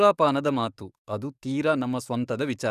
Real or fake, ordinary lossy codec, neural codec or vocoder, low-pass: fake; none; codec, 44.1 kHz, 7.8 kbps, DAC; 14.4 kHz